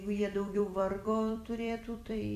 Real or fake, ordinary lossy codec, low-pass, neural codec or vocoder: fake; AAC, 64 kbps; 14.4 kHz; vocoder, 44.1 kHz, 128 mel bands every 256 samples, BigVGAN v2